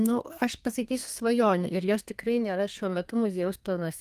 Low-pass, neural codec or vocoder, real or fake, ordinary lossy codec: 14.4 kHz; codec, 32 kHz, 1.9 kbps, SNAC; fake; Opus, 32 kbps